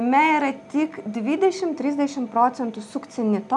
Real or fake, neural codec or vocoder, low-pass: real; none; 10.8 kHz